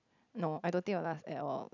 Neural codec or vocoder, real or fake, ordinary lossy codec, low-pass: none; real; none; 7.2 kHz